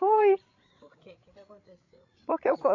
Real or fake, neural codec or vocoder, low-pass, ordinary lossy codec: fake; codec, 16 kHz, 8 kbps, FreqCodec, larger model; 7.2 kHz; AAC, 48 kbps